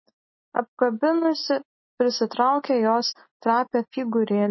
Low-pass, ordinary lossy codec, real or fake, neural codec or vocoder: 7.2 kHz; MP3, 24 kbps; real; none